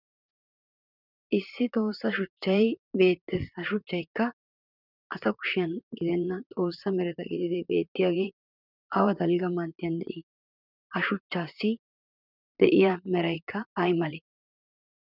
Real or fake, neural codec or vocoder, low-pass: fake; vocoder, 44.1 kHz, 128 mel bands, Pupu-Vocoder; 5.4 kHz